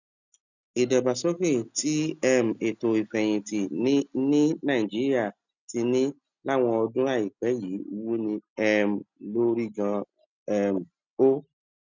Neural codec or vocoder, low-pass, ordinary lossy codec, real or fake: none; 7.2 kHz; none; real